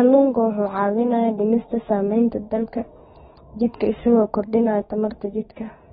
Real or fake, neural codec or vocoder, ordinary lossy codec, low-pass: fake; autoencoder, 48 kHz, 32 numbers a frame, DAC-VAE, trained on Japanese speech; AAC, 16 kbps; 19.8 kHz